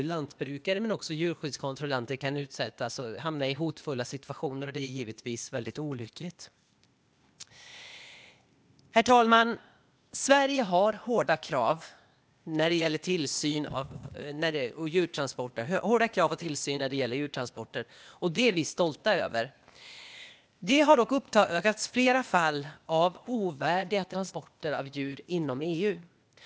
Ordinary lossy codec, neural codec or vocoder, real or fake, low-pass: none; codec, 16 kHz, 0.8 kbps, ZipCodec; fake; none